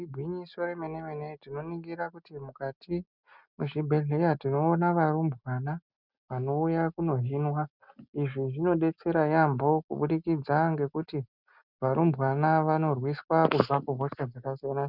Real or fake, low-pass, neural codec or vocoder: real; 5.4 kHz; none